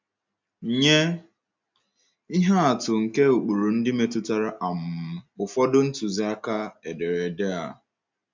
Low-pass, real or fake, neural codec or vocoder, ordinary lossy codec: 7.2 kHz; real; none; MP3, 64 kbps